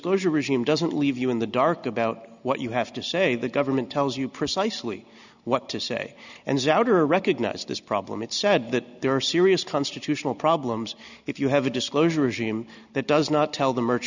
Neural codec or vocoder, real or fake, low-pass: none; real; 7.2 kHz